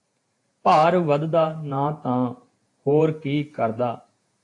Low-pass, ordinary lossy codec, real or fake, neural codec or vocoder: 10.8 kHz; AAC, 48 kbps; fake; vocoder, 48 kHz, 128 mel bands, Vocos